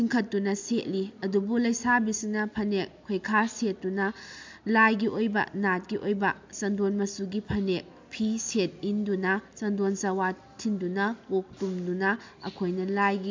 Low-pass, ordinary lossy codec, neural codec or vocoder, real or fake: 7.2 kHz; MP3, 64 kbps; none; real